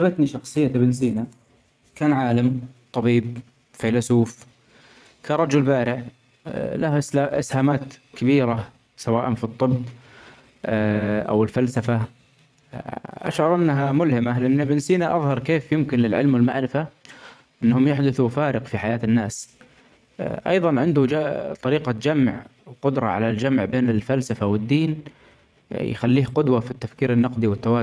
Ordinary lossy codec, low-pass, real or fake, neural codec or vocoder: none; none; fake; vocoder, 22.05 kHz, 80 mel bands, WaveNeXt